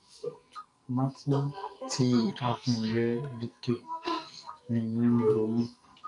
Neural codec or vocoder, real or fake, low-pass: codec, 44.1 kHz, 2.6 kbps, SNAC; fake; 10.8 kHz